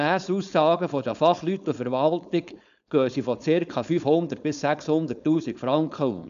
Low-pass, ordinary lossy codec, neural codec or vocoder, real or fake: 7.2 kHz; none; codec, 16 kHz, 4.8 kbps, FACodec; fake